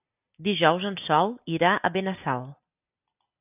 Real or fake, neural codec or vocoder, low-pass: real; none; 3.6 kHz